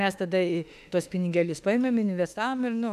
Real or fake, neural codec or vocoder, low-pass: fake; autoencoder, 48 kHz, 32 numbers a frame, DAC-VAE, trained on Japanese speech; 14.4 kHz